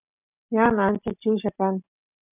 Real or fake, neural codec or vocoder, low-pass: real; none; 3.6 kHz